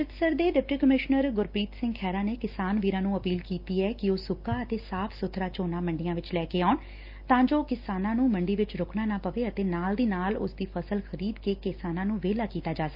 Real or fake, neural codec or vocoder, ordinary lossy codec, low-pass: real; none; Opus, 24 kbps; 5.4 kHz